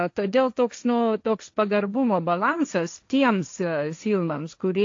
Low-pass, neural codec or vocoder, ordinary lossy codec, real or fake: 7.2 kHz; codec, 16 kHz, 1.1 kbps, Voila-Tokenizer; AAC, 48 kbps; fake